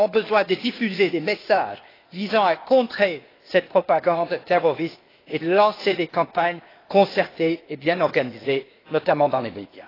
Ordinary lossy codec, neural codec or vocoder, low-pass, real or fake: AAC, 24 kbps; codec, 16 kHz, 0.8 kbps, ZipCodec; 5.4 kHz; fake